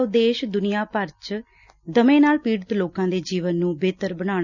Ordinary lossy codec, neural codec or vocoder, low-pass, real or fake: none; none; 7.2 kHz; real